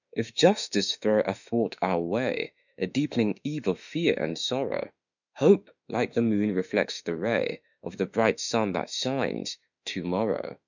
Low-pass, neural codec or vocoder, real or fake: 7.2 kHz; autoencoder, 48 kHz, 32 numbers a frame, DAC-VAE, trained on Japanese speech; fake